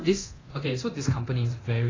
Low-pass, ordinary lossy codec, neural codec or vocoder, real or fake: 7.2 kHz; AAC, 32 kbps; codec, 24 kHz, 0.9 kbps, DualCodec; fake